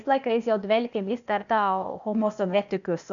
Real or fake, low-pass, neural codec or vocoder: fake; 7.2 kHz; codec, 16 kHz, 0.8 kbps, ZipCodec